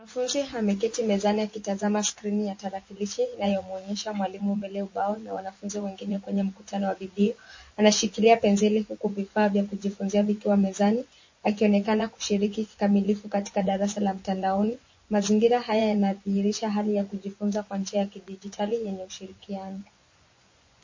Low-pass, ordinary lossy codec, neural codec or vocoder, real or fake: 7.2 kHz; MP3, 32 kbps; vocoder, 24 kHz, 100 mel bands, Vocos; fake